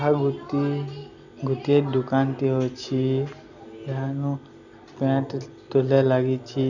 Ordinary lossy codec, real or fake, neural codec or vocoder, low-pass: none; real; none; 7.2 kHz